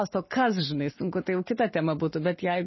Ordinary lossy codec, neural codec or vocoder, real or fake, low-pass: MP3, 24 kbps; none; real; 7.2 kHz